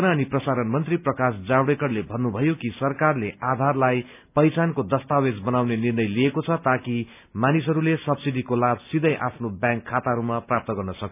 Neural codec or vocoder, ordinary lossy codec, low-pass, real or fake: none; none; 3.6 kHz; real